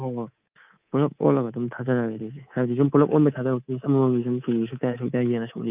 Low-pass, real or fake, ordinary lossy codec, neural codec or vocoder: 3.6 kHz; fake; Opus, 24 kbps; codec, 24 kHz, 3.1 kbps, DualCodec